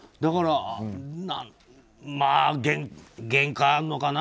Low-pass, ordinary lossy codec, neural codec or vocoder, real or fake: none; none; none; real